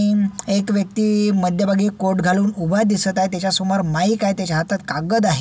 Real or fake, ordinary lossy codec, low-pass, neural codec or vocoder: real; none; none; none